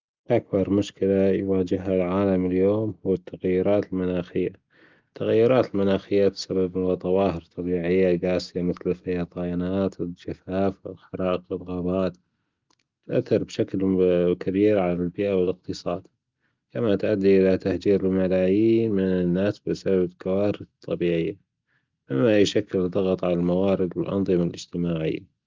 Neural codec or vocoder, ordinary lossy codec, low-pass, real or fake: none; Opus, 32 kbps; 7.2 kHz; real